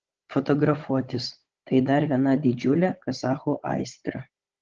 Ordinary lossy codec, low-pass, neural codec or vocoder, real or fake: Opus, 16 kbps; 7.2 kHz; codec, 16 kHz, 16 kbps, FunCodec, trained on Chinese and English, 50 frames a second; fake